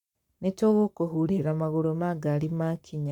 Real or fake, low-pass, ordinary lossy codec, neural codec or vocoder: fake; 19.8 kHz; none; codec, 44.1 kHz, 7.8 kbps, Pupu-Codec